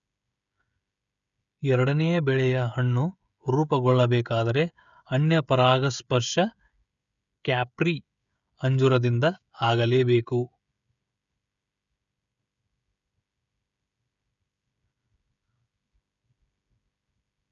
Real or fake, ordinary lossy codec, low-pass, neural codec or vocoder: fake; none; 7.2 kHz; codec, 16 kHz, 16 kbps, FreqCodec, smaller model